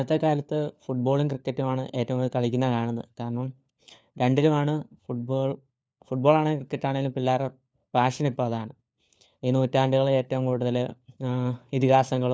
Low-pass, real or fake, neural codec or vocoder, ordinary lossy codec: none; fake; codec, 16 kHz, 4 kbps, FunCodec, trained on LibriTTS, 50 frames a second; none